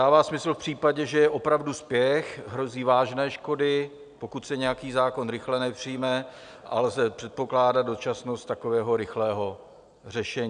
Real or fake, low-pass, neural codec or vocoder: real; 9.9 kHz; none